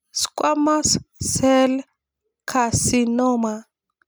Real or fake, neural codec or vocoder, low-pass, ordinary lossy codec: real; none; none; none